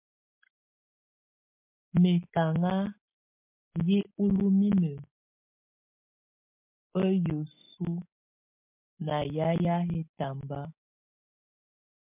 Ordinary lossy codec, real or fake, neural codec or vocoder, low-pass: MP3, 32 kbps; real; none; 3.6 kHz